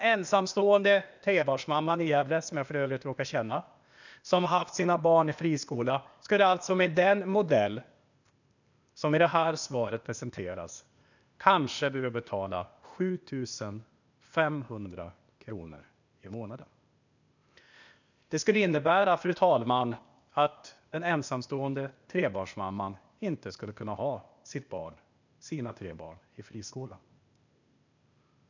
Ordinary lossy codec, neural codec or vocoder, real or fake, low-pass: none; codec, 16 kHz, 0.8 kbps, ZipCodec; fake; 7.2 kHz